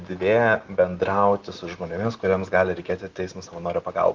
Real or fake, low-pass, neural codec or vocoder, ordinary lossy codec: real; 7.2 kHz; none; Opus, 16 kbps